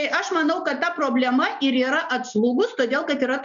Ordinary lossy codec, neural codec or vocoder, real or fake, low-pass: MP3, 96 kbps; none; real; 7.2 kHz